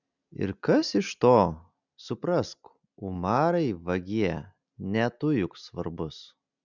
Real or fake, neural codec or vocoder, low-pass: real; none; 7.2 kHz